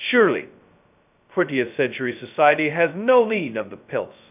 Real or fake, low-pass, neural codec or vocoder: fake; 3.6 kHz; codec, 16 kHz, 0.2 kbps, FocalCodec